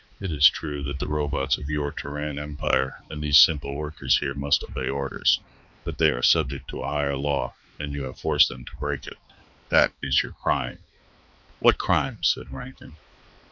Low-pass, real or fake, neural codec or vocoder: 7.2 kHz; fake; codec, 16 kHz, 4 kbps, X-Codec, HuBERT features, trained on balanced general audio